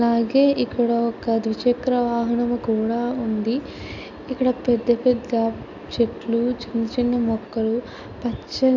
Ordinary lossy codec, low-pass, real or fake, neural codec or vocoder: none; 7.2 kHz; real; none